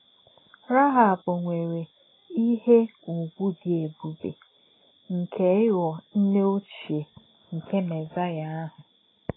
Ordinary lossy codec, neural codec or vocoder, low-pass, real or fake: AAC, 16 kbps; none; 7.2 kHz; real